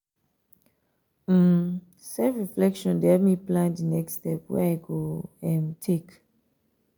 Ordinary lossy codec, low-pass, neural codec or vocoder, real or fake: none; none; none; real